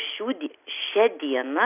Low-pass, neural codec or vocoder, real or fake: 3.6 kHz; none; real